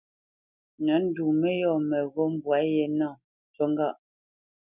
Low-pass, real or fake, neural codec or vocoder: 3.6 kHz; real; none